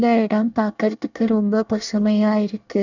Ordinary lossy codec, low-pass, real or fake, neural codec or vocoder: none; 7.2 kHz; fake; codec, 24 kHz, 1 kbps, SNAC